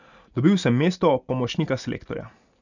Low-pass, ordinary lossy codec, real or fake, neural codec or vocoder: 7.2 kHz; none; real; none